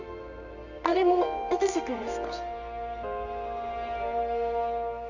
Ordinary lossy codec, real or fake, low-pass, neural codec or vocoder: none; fake; 7.2 kHz; codec, 24 kHz, 0.9 kbps, WavTokenizer, medium music audio release